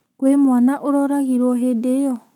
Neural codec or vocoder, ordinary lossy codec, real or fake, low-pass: codec, 44.1 kHz, 7.8 kbps, Pupu-Codec; none; fake; 19.8 kHz